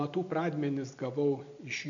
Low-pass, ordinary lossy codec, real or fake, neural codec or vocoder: 7.2 kHz; MP3, 96 kbps; real; none